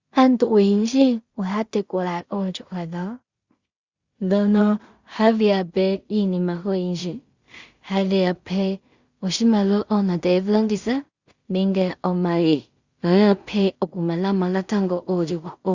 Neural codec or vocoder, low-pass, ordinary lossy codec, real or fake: codec, 16 kHz in and 24 kHz out, 0.4 kbps, LongCat-Audio-Codec, two codebook decoder; 7.2 kHz; Opus, 64 kbps; fake